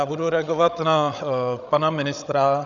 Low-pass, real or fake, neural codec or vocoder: 7.2 kHz; fake; codec, 16 kHz, 16 kbps, FreqCodec, larger model